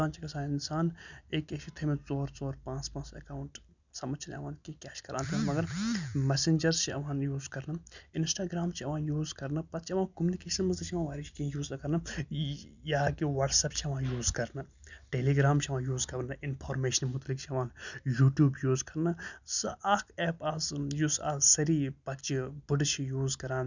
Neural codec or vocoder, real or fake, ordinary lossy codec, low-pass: none; real; none; 7.2 kHz